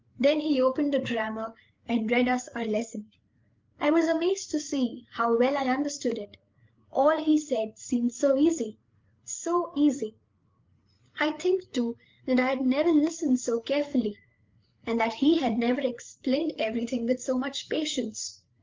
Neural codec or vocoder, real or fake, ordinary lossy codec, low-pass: codec, 16 kHz, 8 kbps, FreqCodec, larger model; fake; Opus, 32 kbps; 7.2 kHz